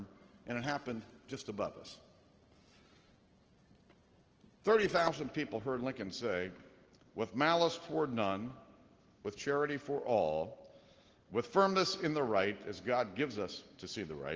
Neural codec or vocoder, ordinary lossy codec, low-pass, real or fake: none; Opus, 16 kbps; 7.2 kHz; real